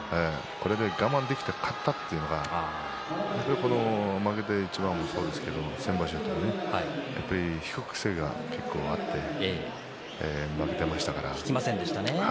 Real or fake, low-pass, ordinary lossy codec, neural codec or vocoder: real; none; none; none